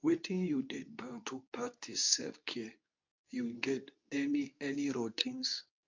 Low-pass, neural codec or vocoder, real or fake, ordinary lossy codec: 7.2 kHz; codec, 24 kHz, 0.9 kbps, WavTokenizer, medium speech release version 2; fake; MP3, 48 kbps